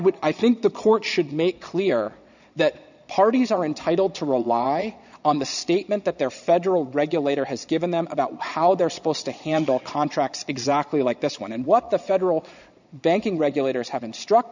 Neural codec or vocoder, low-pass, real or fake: vocoder, 22.05 kHz, 80 mel bands, Vocos; 7.2 kHz; fake